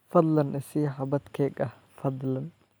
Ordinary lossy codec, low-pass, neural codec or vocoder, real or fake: none; none; none; real